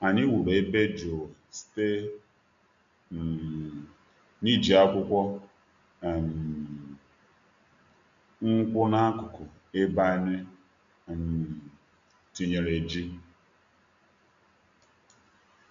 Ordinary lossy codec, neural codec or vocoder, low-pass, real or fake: MP3, 64 kbps; none; 7.2 kHz; real